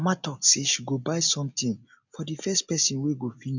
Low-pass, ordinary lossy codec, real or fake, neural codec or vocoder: 7.2 kHz; none; real; none